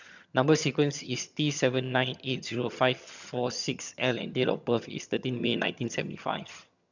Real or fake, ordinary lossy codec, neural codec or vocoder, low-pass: fake; none; vocoder, 22.05 kHz, 80 mel bands, HiFi-GAN; 7.2 kHz